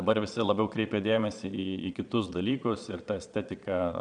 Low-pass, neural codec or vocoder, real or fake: 9.9 kHz; vocoder, 22.05 kHz, 80 mel bands, Vocos; fake